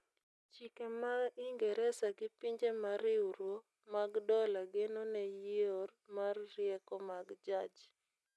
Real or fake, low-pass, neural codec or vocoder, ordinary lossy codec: real; none; none; none